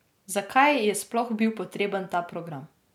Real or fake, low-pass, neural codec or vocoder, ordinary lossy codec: real; 19.8 kHz; none; none